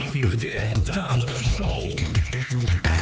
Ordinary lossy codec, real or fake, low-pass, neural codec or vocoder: none; fake; none; codec, 16 kHz, 2 kbps, X-Codec, HuBERT features, trained on LibriSpeech